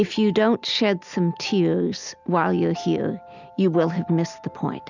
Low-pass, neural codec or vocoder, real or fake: 7.2 kHz; none; real